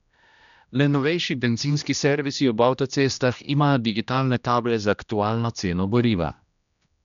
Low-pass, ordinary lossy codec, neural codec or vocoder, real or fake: 7.2 kHz; none; codec, 16 kHz, 1 kbps, X-Codec, HuBERT features, trained on general audio; fake